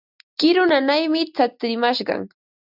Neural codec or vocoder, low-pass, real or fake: none; 5.4 kHz; real